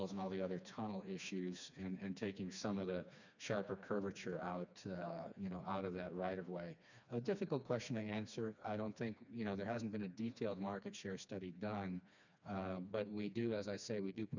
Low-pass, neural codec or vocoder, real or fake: 7.2 kHz; codec, 16 kHz, 2 kbps, FreqCodec, smaller model; fake